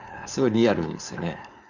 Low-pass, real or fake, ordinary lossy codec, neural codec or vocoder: 7.2 kHz; fake; none; codec, 16 kHz, 4.8 kbps, FACodec